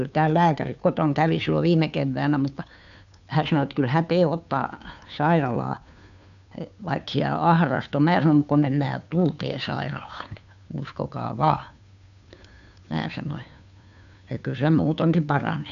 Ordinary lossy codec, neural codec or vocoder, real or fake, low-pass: none; codec, 16 kHz, 2 kbps, FunCodec, trained on Chinese and English, 25 frames a second; fake; 7.2 kHz